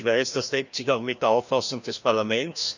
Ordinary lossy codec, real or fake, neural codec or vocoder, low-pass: none; fake; codec, 16 kHz, 1 kbps, FreqCodec, larger model; 7.2 kHz